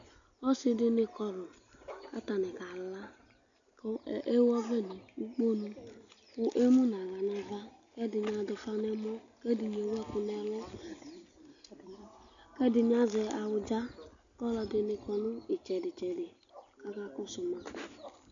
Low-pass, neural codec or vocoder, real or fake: 7.2 kHz; none; real